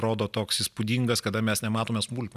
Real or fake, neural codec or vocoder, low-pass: real; none; 14.4 kHz